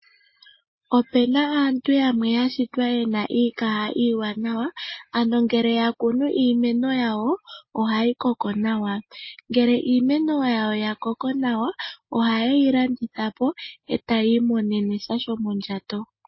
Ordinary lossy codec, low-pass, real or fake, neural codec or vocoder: MP3, 24 kbps; 7.2 kHz; real; none